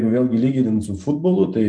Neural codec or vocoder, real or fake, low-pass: none; real; 9.9 kHz